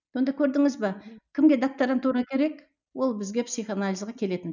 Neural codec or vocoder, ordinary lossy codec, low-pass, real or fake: none; none; 7.2 kHz; real